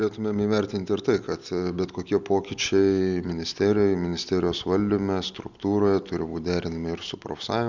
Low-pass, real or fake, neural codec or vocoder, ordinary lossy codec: 7.2 kHz; real; none; Opus, 64 kbps